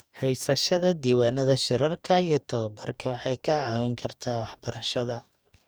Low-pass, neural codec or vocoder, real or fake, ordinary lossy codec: none; codec, 44.1 kHz, 2.6 kbps, DAC; fake; none